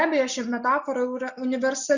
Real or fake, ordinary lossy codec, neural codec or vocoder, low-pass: real; Opus, 64 kbps; none; 7.2 kHz